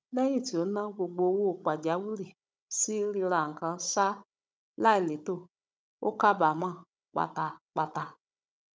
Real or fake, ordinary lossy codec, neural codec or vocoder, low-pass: fake; none; codec, 16 kHz, 16 kbps, FunCodec, trained on Chinese and English, 50 frames a second; none